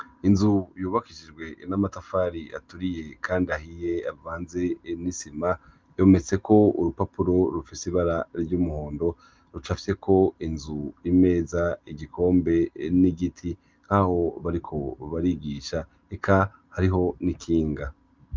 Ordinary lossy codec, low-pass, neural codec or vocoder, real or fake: Opus, 32 kbps; 7.2 kHz; none; real